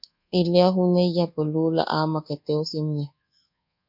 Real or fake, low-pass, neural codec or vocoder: fake; 5.4 kHz; codec, 24 kHz, 1.2 kbps, DualCodec